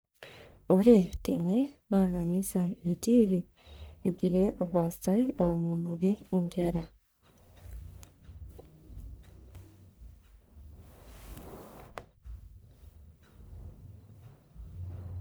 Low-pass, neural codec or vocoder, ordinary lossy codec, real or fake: none; codec, 44.1 kHz, 1.7 kbps, Pupu-Codec; none; fake